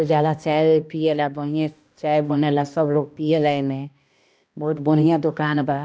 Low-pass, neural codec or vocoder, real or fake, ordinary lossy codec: none; codec, 16 kHz, 1 kbps, X-Codec, HuBERT features, trained on balanced general audio; fake; none